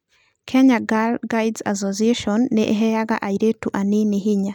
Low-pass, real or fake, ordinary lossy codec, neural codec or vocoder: 19.8 kHz; real; none; none